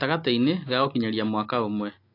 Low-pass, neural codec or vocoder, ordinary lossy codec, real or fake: 5.4 kHz; none; AAC, 32 kbps; real